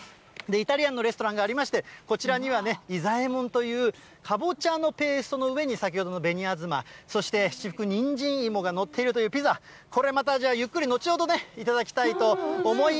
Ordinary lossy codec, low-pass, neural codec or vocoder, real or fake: none; none; none; real